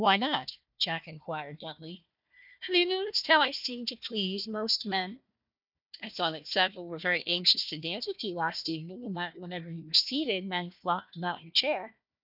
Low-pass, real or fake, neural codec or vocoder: 5.4 kHz; fake; codec, 16 kHz, 1 kbps, FunCodec, trained on Chinese and English, 50 frames a second